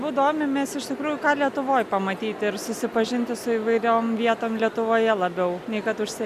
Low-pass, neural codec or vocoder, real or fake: 14.4 kHz; none; real